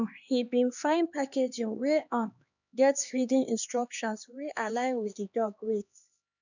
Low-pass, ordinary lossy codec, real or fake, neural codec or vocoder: 7.2 kHz; none; fake; codec, 16 kHz, 2 kbps, X-Codec, HuBERT features, trained on LibriSpeech